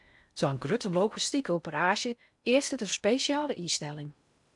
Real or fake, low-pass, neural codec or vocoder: fake; 10.8 kHz; codec, 16 kHz in and 24 kHz out, 0.6 kbps, FocalCodec, streaming, 4096 codes